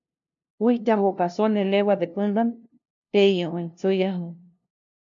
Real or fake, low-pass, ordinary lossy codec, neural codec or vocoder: fake; 7.2 kHz; MP3, 64 kbps; codec, 16 kHz, 0.5 kbps, FunCodec, trained on LibriTTS, 25 frames a second